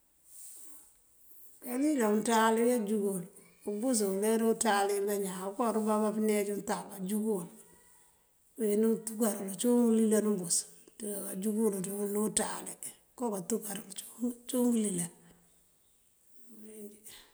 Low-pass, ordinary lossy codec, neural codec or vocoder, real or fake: none; none; none; real